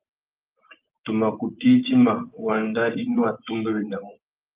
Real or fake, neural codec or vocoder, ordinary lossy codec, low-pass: fake; vocoder, 24 kHz, 100 mel bands, Vocos; Opus, 24 kbps; 3.6 kHz